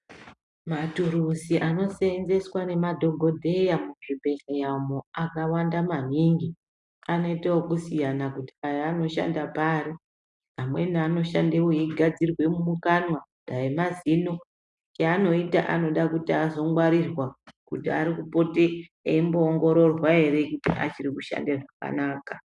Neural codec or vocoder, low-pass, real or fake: none; 10.8 kHz; real